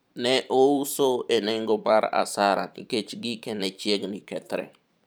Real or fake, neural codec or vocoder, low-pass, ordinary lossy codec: fake; vocoder, 44.1 kHz, 128 mel bands every 512 samples, BigVGAN v2; none; none